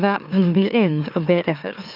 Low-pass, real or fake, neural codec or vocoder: 5.4 kHz; fake; autoencoder, 44.1 kHz, a latent of 192 numbers a frame, MeloTTS